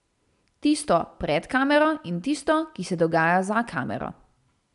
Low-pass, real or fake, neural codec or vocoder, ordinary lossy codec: 10.8 kHz; real; none; none